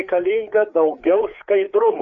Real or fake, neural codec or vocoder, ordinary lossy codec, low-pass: fake; codec, 16 kHz, 4 kbps, FreqCodec, larger model; MP3, 64 kbps; 7.2 kHz